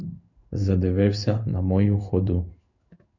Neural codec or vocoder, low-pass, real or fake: codec, 16 kHz in and 24 kHz out, 1 kbps, XY-Tokenizer; 7.2 kHz; fake